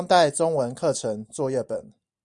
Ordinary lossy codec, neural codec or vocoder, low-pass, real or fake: Opus, 64 kbps; vocoder, 44.1 kHz, 128 mel bands every 256 samples, BigVGAN v2; 10.8 kHz; fake